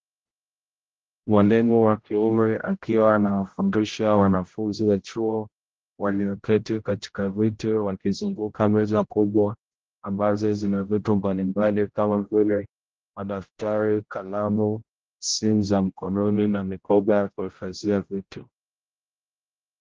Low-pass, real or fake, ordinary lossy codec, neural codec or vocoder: 7.2 kHz; fake; Opus, 16 kbps; codec, 16 kHz, 0.5 kbps, X-Codec, HuBERT features, trained on general audio